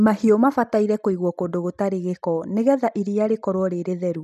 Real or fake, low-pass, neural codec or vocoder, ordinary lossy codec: real; 14.4 kHz; none; none